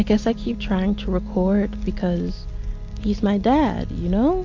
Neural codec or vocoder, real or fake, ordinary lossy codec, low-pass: none; real; MP3, 48 kbps; 7.2 kHz